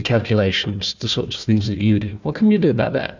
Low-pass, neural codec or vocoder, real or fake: 7.2 kHz; codec, 16 kHz, 1 kbps, FunCodec, trained on Chinese and English, 50 frames a second; fake